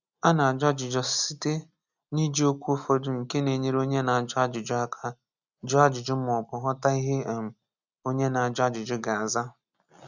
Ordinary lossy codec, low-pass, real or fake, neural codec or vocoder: none; 7.2 kHz; real; none